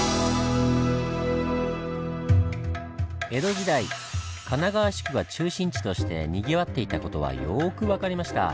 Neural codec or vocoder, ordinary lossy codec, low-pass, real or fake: none; none; none; real